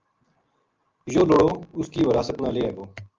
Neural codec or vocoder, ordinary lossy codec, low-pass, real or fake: none; Opus, 24 kbps; 7.2 kHz; real